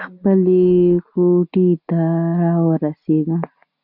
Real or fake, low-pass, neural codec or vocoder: real; 5.4 kHz; none